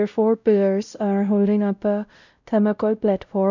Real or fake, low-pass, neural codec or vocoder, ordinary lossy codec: fake; 7.2 kHz; codec, 16 kHz, 0.5 kbps, X-Codec, WavLM features, trained on Multilingual LibriSpeech; none